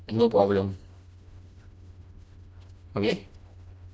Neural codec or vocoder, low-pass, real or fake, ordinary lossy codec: codec, 16 kHz, 1 kbps, FreqCodec, smaller model; none; fake; none